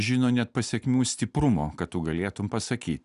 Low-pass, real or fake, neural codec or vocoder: 10.8 kHz; real; none